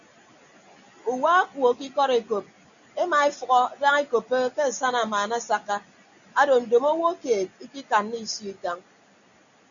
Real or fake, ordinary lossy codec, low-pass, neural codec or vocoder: real; MP3, 96 kbps; 7.2 kHz; none